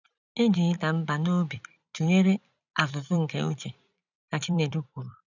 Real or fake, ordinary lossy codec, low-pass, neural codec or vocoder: fake; none; 7.2 kHz; vocoder, 44.1 kHz, 128 mel bands every 256 samples, BigVGAN v2